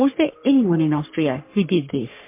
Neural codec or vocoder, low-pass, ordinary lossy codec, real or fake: codec, 44.1 kHz, 3.4 kbps, Pupu-Codec; 3.6 kHz; MP3, 24 kbps; fake